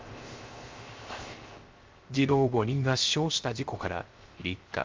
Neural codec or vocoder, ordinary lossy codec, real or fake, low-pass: codec, 16 kHz, 0.3 kbps, FocalCodec; Opus, 32 kbps; fake; 7.2 kHz